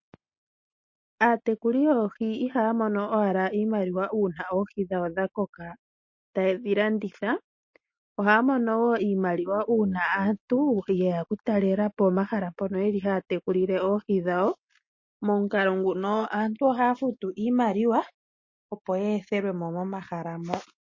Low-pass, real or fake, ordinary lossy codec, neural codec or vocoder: 7.2 kHz; real; MP3, 32 kbps; none